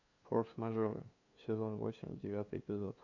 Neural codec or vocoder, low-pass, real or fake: codec, 16 kHz, 2 kbps, FunCodec, trained on LibriTTS, 25 frames a second; 7.2 kHz; fake